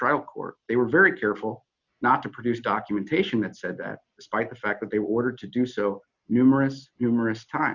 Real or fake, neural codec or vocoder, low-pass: real; none; 7.2 kHz